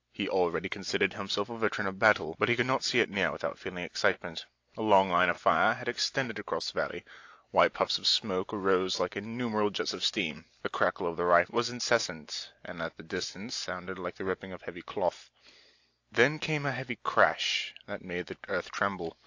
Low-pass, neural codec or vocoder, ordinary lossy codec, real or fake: 7.2 kHz; none; AAC, 48 kbps; real